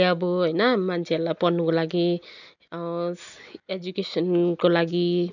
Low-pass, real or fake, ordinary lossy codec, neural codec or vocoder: 7.2 kHz; real; none; none